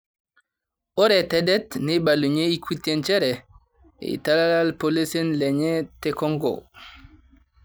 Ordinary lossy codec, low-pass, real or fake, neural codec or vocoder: none; none; real; none